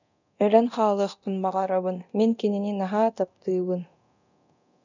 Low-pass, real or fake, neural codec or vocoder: 7.2 kHz; fake; codec, 24 kHz, 0.9 kbps, DualCodec